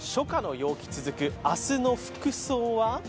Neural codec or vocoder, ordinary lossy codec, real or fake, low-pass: none; none; real; none